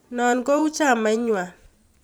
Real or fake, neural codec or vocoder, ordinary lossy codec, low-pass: fake; vocoder, 44.1 kHz, 128 mel bands every 512 samples, BigVGAN v2; none; none